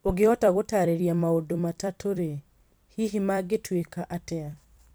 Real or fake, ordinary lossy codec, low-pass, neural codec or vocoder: fake; none; none; vocoder, 44.1 kHz, 128 mel bands every 256 samples, BigVGAN v2